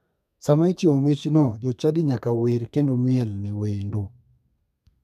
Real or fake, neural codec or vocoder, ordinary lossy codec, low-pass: fake; codec, 32 kHz, 1.9 kbps, SNAC; none; 14.4 kHz